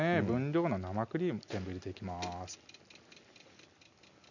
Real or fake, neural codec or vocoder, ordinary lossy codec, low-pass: real; none; none; 7.2 kHz